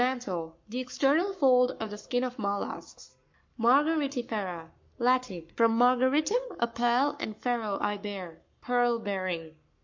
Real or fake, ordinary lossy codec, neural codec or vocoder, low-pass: fake; MP3, 48 kbps; codec, 44.1 kHz, 7.8 kbps, Pupu-Codec; 7.2 kHz